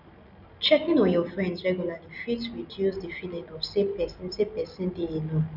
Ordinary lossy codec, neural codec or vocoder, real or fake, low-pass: none; none; real; 5.4 kHz